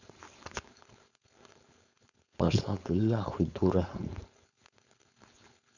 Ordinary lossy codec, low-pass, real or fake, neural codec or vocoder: none; 7.2 kHz; fake; codec, 16 kHz, 4.8 kbps, FACodec